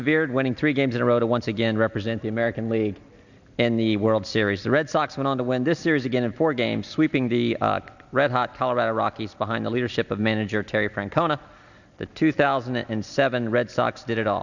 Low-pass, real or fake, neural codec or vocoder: 7.2 kHz; real; none